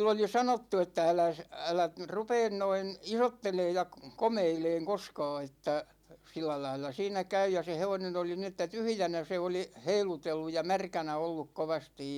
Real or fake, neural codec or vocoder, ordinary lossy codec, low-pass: real; none; none; 19.8 kHz